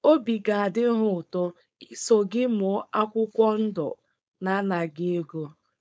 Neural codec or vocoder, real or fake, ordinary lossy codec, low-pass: codec, 16 kHz, 4.8 kbps, FACodec; fake; none; none